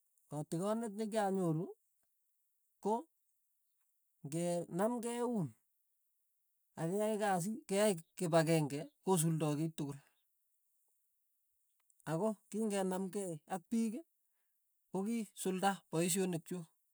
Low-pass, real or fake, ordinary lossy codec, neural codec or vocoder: none; real; none; none